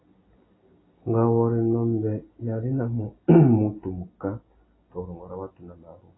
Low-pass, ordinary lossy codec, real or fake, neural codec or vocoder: 7.2 kHz; AAC, 16 kbps; real; none